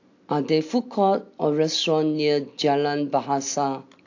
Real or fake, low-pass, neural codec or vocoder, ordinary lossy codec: real; 7.2 kHz; none; AAC, 48 kbps